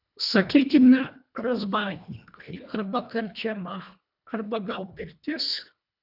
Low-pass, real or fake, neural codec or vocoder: 5.4 kHz; fake; codec, 24 kHz, 1.5 kbps, HILCodec